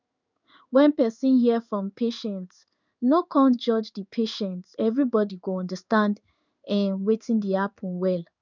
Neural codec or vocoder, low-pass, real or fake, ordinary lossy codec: codec, 16 kHz in and 24 kHz out, 1 kbps, XY-Tokenizer; 7.2 kHz; fake; none